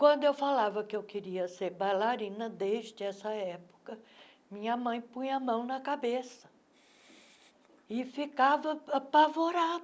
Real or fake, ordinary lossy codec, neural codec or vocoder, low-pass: real; none; none; none